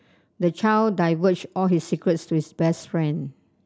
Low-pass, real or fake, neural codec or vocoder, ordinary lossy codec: none; real; none; none